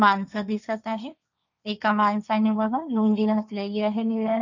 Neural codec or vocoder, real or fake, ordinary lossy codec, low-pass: codec, 16 kHz, 1.1 kbps, Voila-Tokenizer; fake; none; 7.2 kHz